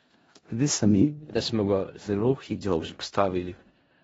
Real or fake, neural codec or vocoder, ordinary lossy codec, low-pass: fake; codec, 16 kHz in and 24 kHz out, 0.4 kbps, LongCat-Audio-Codec, four codebook decoder; AAC, 24 kbps; 10.8 kHz